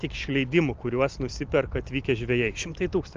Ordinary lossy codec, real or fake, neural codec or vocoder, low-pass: Opus, 24 kbps; real; none; 7.2 kHz